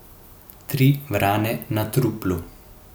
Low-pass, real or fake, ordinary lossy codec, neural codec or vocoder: none; real; none; none